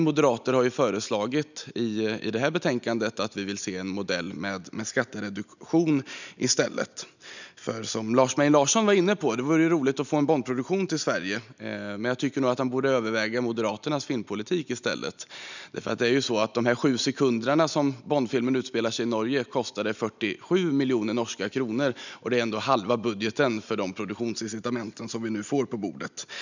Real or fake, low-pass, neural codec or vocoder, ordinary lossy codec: real; 7.2 kHz; none; none